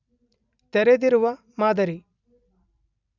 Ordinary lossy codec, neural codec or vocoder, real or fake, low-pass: none; vocoder, 44.1 kHz, 128 mel bands every 256 samples, BigVGAN v2; fake; 7.2 kHz